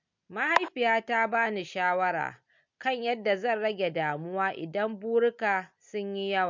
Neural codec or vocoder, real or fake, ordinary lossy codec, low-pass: none; real; MP3, 64 kbps; 7.2 kHz